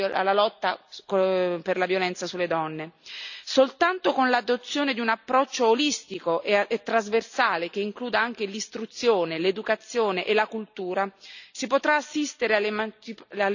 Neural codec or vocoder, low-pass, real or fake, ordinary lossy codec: none; 7.2 kHz; real; none